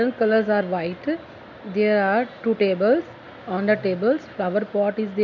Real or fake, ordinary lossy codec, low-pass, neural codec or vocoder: real; none; 7.2 kHz; none